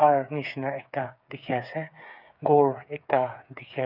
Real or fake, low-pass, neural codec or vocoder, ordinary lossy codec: fake; 5.4 kHz; codec, 16 kHz, 8 kbps, FreqCodec, smaller model; none